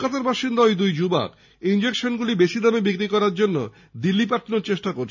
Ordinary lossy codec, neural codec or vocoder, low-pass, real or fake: none; none; 7.2 kHz; real